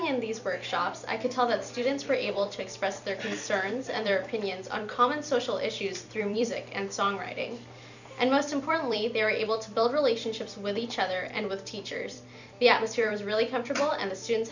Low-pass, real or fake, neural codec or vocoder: 7.2 kHz; fake; vocoder, 44.1 kHz, 128 mel bands every 256 samples, BigVGAN v2